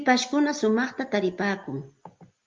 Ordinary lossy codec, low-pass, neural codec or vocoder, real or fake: Opus, 32 kbps; 7.2 kHz; none; real